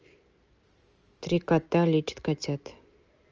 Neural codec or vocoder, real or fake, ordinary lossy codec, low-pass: none; real; Opus, 24 kbps; 7.2 kHz